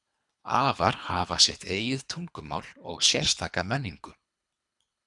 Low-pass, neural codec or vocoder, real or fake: 10.8 kHz; codec, 24 kHz, 3 kbps, HILCodec; fake